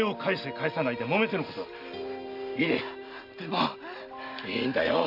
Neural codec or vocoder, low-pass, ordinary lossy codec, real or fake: none; 5.4 kHz; none; real